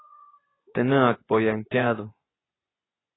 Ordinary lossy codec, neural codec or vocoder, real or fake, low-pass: AAC, 16 kbps; vocoder, 24 kHz, 100 mel bands, Vocos; fake; 7.2 kHz